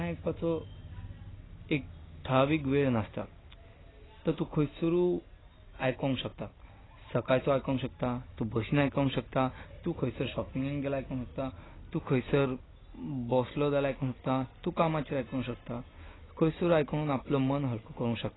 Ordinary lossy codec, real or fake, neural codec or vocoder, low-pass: AAC, 16 kbps; real; none; 7.2 kHz